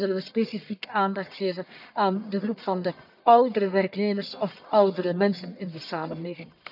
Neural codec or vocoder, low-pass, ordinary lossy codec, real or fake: codec, 44.1 kHz, 1.7 kbps, Pupu-Codec; 5.4 kHz; none; fake